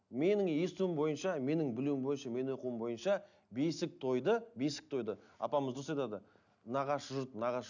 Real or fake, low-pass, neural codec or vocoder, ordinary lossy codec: real; 7.2 kHz; none; none